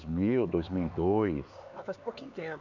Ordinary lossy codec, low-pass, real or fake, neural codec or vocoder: none; 7.2 kHz; fake; codec, 16 kHz, 4 kbps, X-Codec, HuBERT features, trained on LibriSpeech